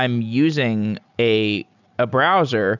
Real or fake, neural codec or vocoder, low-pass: real; none; 7.2 kHz